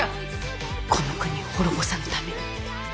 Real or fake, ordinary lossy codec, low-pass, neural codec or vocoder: real; none; none; none